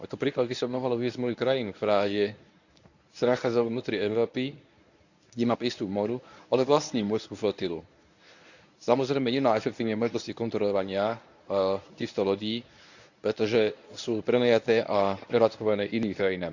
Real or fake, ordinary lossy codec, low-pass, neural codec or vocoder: fake; none; 7.2 kHz; codec, 24 kHz, 0.9 kbps, WavTokenizer, medium speech release version 1